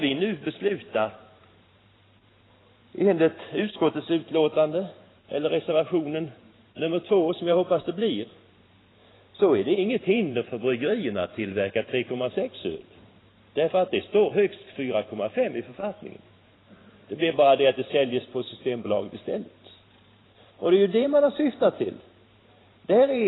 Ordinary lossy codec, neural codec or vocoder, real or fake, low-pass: AAC, 16 kbps; vocoder, 44.1 kHz, 128 mel bands every 512 samples, BigVGAN v2; fake; 7.2 kHz